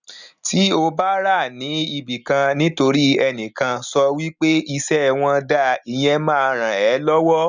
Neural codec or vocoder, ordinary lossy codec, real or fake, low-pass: none; none; real; 7.2 kHz